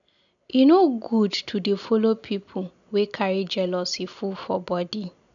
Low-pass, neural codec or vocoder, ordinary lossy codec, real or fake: 7.2 kHz; none; none; real